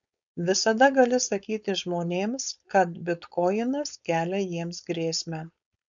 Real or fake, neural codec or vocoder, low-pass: fake; codec, 16 kHz, 4.8 kbps, FACodec; 7.2 kHz